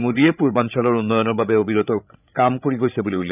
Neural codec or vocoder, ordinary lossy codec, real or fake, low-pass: codec, 16 kHz, 8 kbps, FreqCodec, larger model; none; fake; 3.6 kHz